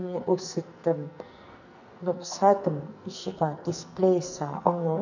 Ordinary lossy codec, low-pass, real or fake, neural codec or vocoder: none; 7.2 kHz; fake; codec, 44.1 kHz, 2.6 kbps, SNAC